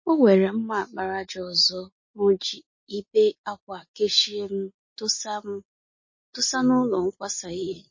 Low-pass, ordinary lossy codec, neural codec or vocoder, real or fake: 7.2 kHz; MP3, 32 kbps; none; real